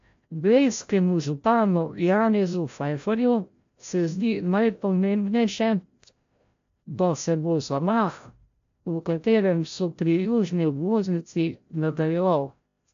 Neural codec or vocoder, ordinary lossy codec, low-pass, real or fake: codec, 16 kHz, 0.5 kbps, FreqCodec, larger model; AAC, 64 kbps; 7.2 kHz; fake